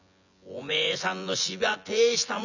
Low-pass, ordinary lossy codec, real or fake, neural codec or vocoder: 7.2 kHz; none; fake; vocoder, 24 kHz, 100 mel bands, Vocos